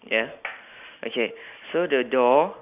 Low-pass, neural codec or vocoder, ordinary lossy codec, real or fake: 3.6 kHz; none; none; real